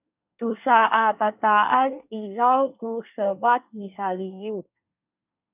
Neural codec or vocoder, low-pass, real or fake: codec, 24 kHz, 1 kbps, SNAC; 3.6 kHz; fake